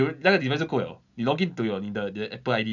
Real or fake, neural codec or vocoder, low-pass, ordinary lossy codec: real; none; 7.2 kHz; none